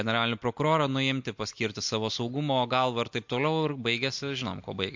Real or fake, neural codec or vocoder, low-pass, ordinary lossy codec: real; none; 7.2 kHz; MP3, 48 kbps